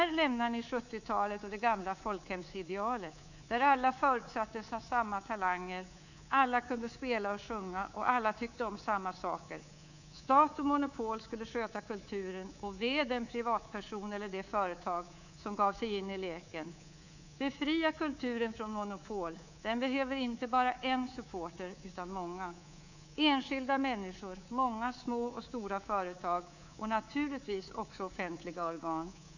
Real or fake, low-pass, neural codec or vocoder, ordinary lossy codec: fake; 7.2 kHz; codec, 24 kHz, 3.1 kbps, DualCodec; none